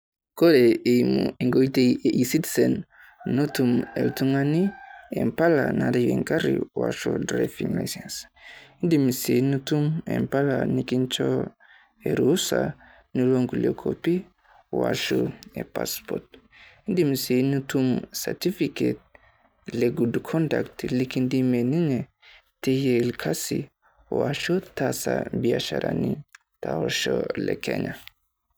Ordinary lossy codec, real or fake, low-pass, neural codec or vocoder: none; real; none; none